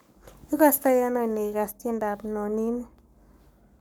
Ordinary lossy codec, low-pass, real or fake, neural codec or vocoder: none; none; fake; codec, 44.1 kHz, 7.8 kbps, Pupu-Codec